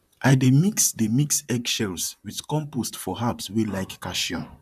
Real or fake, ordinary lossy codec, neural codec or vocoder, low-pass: fake; none; vocoder, 44.1 kHz, 128 mel bands, Pupu-Vocoder; 14.4 kHz